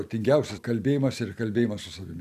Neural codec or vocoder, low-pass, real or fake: none; 14.4 kHz; real